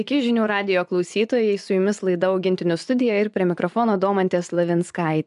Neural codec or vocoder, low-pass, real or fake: vocoder, 24 kHz, 100 mel bands, Vocos; 10.8 kHz; fake